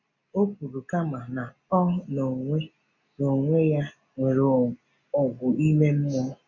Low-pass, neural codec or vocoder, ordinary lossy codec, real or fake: 7.2 kHz; none; none; real